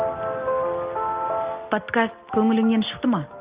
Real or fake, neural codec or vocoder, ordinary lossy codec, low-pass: real; none; Opus, 32 kbps; 3.6 kHz